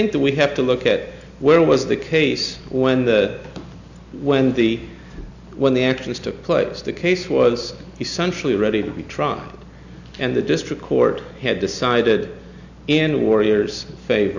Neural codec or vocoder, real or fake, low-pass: none; real; 7.2 kHz